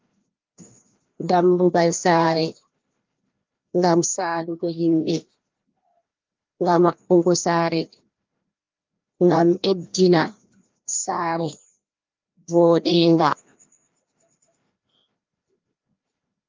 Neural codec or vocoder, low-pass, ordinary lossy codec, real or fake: codec, 16 kHz, 1 kbps, FreqCodec, larger model; 7.2 kHz; Opus, 24 kbps; fake